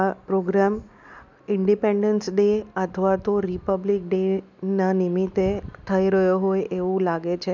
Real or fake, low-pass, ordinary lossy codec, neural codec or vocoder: real; 7.2 kHz; none; none